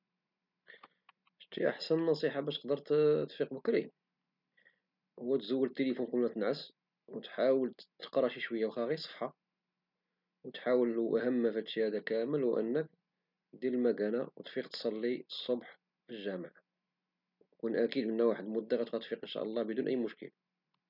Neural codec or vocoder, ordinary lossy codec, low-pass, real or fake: none; none; 5.4 kHz; real